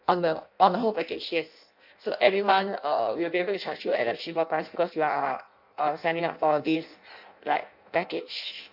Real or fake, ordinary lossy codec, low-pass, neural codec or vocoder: fake; MP3, 48 kbps; 5.4 kHz; codec, 16 kHz in and 24 kHz out, 0.6 kbps, FireRedTTS-2 codec